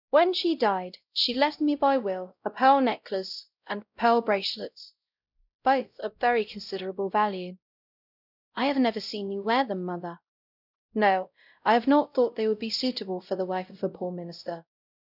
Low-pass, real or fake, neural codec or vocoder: 5.4 kHz; fake; codec, 16 kHz, 0.5 kbps, X-Codec, WavLM features, trained on Multilingual LibriSpeech